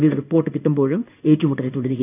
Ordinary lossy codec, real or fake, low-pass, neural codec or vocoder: none; fake; 3.6 kHz; codec, 16 kHz in and 24 kHz out, 1 kbps, XY-Tokenizer